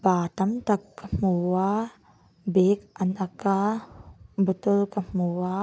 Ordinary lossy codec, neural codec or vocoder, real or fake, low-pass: none; none; real; none